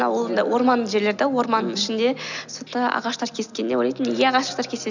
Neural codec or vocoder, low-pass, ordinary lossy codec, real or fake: none; 7.2 kHz; none; real